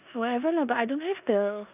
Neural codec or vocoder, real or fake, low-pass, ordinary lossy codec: codec, 16 kHz in and 24 kHz out, 0.9 kbps, LongCat-Audio-Codec, four codebook decoder; fake; 3.6 kHz; none